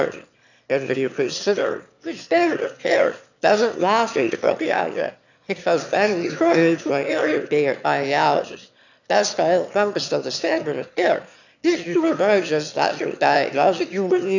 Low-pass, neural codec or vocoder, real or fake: 7.2 kHz; autoencoder, 22.05 kHz, a latent of 192 numbers a frame, VITS, trained on one speaker; fake